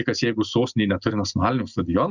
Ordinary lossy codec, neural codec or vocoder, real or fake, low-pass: Opus, 64 kbps; none; real; 7.2 kHz